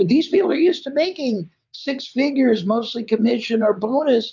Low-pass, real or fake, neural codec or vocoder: 7.2 kHz; fake; vocoder, 22.05 kHz, 80 mel bands, WaveNeXt